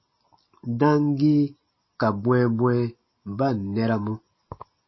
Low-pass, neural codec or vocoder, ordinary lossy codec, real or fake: 7.2 kHz; none; MP3, 24 kbps; real